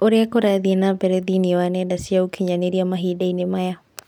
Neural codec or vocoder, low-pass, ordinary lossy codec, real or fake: none; 19.8 kHz; none; real